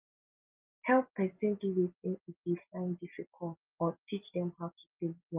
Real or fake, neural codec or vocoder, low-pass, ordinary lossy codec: real; none; 3.6 kHz; Opus, 32 kbps